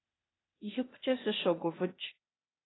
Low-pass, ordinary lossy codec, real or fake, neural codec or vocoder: 7.2 kHz; AAC, 16 kbps; fake; codec, 16 kHz, 0.8 kbps, ZipCodec